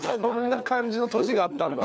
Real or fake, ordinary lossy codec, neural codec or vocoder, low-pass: fake; none; codec, 16 kHz, 4 kbps, FunCodec, trained on LibriTTS, 50 frames a second; none